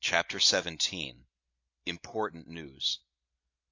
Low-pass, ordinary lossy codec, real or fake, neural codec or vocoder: 7.2 kHz; AAC, 48 kbps; real; none